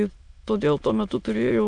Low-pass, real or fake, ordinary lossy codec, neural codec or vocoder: 9.9 kHz; fake; AAC, 64 kbps; autoencoder, 22.05 kHz, a latent of 192 numbers a frame, VITS, trained on many speakers